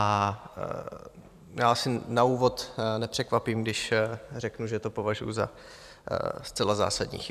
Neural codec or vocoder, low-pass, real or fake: vocoder, 44.1 kHz, 128 mel bands every 512 samples, BigVGAN v2; 14.4 kHz; fake